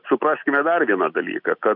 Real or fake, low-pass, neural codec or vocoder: real; 5.4 kHz; none